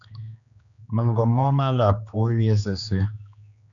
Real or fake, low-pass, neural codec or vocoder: fake; 7.2 kHz; codec, 16 kHz, 2 kbps, X-Codec, HuBERT features, trained on general audio